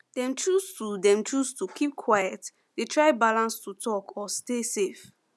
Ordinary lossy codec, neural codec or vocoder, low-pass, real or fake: none; none; none; real